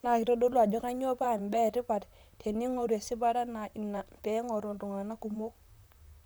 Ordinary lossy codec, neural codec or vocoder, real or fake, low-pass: none; vocoder, 44.1 kHz, 128 mel bands, Pupu-Vocoder; fake; none